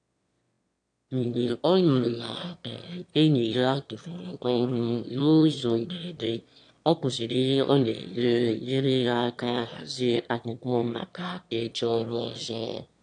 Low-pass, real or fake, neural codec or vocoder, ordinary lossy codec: 9.9 kHz; fake; autoencoder, 22.05 kHz, a latent of 192 numbers a frame, VITS, trained on one speaker; AAC, 64 kbps